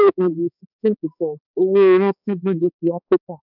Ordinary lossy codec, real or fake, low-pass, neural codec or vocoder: none; fake; 5.4 kHz; codec, 16 kHz, 2 kbps, X-Codec, HuBERT features, trained on balanced general audio